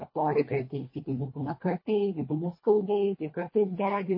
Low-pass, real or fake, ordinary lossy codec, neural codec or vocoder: 5.4 kHz; fake; MP3, 24 kbps; codec, 24 kHz, 1.5 kbps, HILCodec